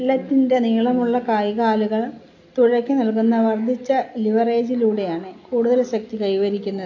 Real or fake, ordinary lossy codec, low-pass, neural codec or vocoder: real; AAC, 48 kbps; 7.2 kHz; none